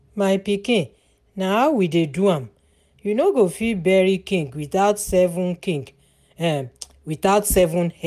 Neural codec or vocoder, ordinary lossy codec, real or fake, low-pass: none; none; real; 14.4 kHz